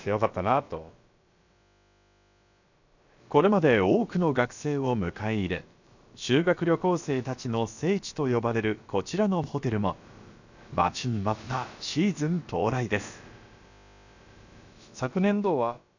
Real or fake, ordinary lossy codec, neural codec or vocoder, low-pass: fake; none; codec, 16 kHz, about 1 kbps, DyCAST, with the encoder's durations; 7.2 kHz